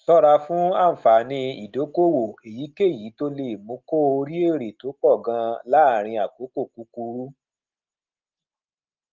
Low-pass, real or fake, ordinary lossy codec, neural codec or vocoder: 7.2 kHz; real; Opus, 32 kbps; none